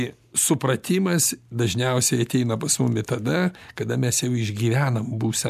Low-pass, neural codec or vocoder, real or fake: 14.4 kHz; none; real